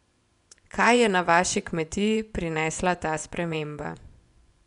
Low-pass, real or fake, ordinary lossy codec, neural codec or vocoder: 10.8 kHz; fake; none; vocoder, 24 kHz, 100 mel bands, Vocos